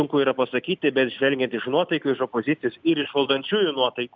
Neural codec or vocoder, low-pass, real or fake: none; 7.2 kHz; real